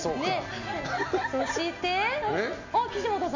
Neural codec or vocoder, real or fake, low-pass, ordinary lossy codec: none; real; 7.2 kHz; MP3, 48 kbps